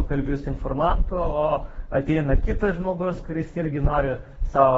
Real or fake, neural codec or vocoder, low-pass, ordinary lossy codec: fake; codec, 24 kHz, 3 kbps, HILCodec; 10.8 kHz; AAC, 24 kbps